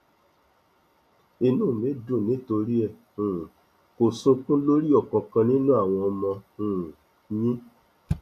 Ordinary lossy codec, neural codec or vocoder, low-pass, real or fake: AAC, 96 kbps; none; 14.4 kHz; real